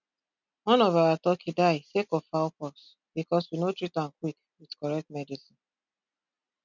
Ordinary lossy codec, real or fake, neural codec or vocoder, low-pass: none; real; none; 7.2 kHz